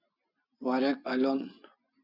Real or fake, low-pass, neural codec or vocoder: real; 5.4 kHz; none